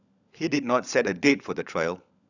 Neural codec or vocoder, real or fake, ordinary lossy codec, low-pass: codec, 16 kHz, 16 kbps, FunCodec, trained on LibriTTS, 50 frames a second; fake; none; 7.2 kHz